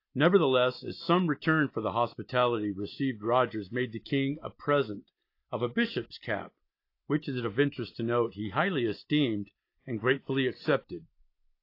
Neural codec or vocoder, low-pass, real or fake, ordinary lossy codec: none; 5.4 kHz; real; AAC, 32 kbps